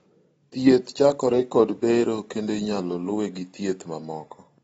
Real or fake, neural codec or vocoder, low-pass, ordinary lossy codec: real; none; 19.8 kHz; AAC, 24 kbps